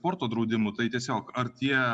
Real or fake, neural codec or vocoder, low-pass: real; none; 10.8 kHz